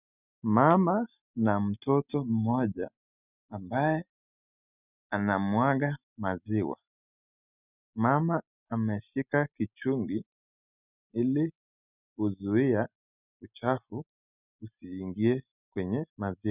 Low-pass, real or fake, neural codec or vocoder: 3.6 kHz; real; none